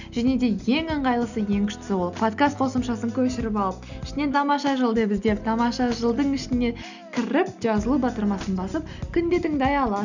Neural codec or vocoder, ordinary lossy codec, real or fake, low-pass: none; none; real; 7.2 kHz